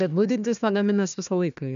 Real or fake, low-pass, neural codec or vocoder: fake; 7.2 kHz; codec, 16 kHz, 1 kbps, FunCodec, trained on Chinese and English, 50 frames a second